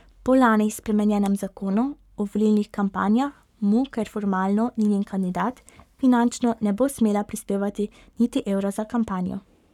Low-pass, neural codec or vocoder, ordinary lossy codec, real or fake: 19.8 kHz; codec, 44.1 kHz, 7.8 kbps, Pupu-Codec; none; fake